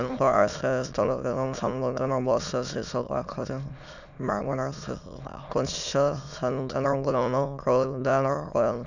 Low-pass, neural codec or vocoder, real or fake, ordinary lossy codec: 7.2 kHz; autoencoder, 22.05 kHz, a latent of 192 numbers a frame, VITS, trained on many speakers; fake; none